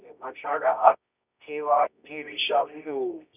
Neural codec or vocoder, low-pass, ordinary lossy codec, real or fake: codec, 24 kHz, 0.9 kbps, WavTokenizer, medium music audio release; 3.6 kHz; none; fake